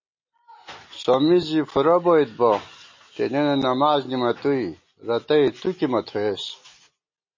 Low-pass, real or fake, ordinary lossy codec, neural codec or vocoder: 7.2 kHz; real; MP3, 32 kbps; none